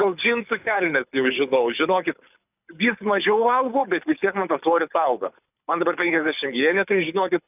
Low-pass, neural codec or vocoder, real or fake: 3.6 kHz; codec, 24 kHz, 6 kbps, HILCodec; fake